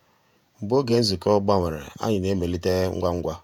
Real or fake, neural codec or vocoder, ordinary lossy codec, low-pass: fake; vocoder, 48 kHz, 128 mel bands, Vocos; none; 19.8 kHz